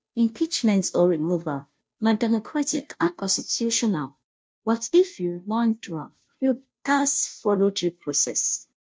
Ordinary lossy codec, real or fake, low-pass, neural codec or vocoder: none; fake; none; codec, 16 kHz, 0.5 kbps, FunCodec, trained on Chinese and English, 25 frames a second